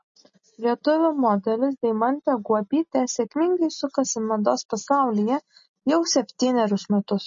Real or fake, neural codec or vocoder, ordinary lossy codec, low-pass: real; none; MP3, 32 kbps; 7.2 kHz